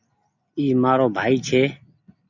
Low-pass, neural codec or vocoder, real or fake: 7.2 kHz; none; real